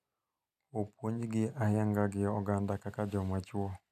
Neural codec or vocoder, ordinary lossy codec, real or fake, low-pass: none; none; real; 14.4 kHz